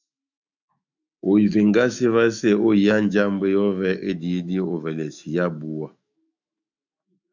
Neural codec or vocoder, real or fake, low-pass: autoencoder, 48 kHz, 128 numbers a frame, DAC-VAE, trained on Japanese speech; fake; 7.2 kHz